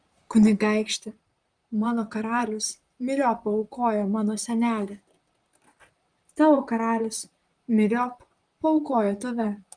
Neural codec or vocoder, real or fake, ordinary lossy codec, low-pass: vocoder, 44.1 kHz, 128 mel bands, Pupu-Vocoder; fake; Opus, 32 kbps; 9.9 kHz